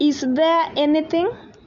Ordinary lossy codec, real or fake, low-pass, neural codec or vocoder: MP3, 64 kbps; real; 7.2 kHz; none